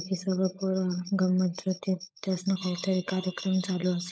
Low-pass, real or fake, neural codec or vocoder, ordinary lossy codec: none; fake; codec, 16 kHz, 16 kbps, FunCodec, trained on LibriTTS, 50 frames a second; none